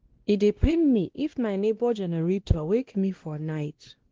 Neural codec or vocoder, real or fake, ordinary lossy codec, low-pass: codec, 16 kHz, 1 kbps, X-Codec, WavLM features, trained on Multilingual LibriSpeech; fake; Opus, 16 kbps; 7.2 kHz